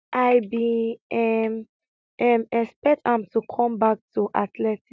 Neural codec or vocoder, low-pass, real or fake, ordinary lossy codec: none; none; real; none